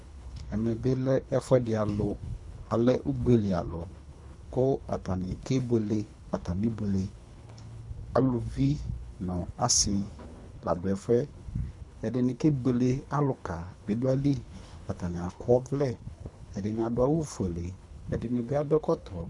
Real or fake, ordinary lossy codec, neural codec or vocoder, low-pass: fake; MP3, 96 kbps; codec, 24 kHz, 3 kbps, HILCodec; 10.8 kHz